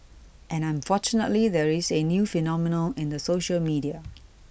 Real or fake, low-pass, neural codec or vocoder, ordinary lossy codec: real; none; none; none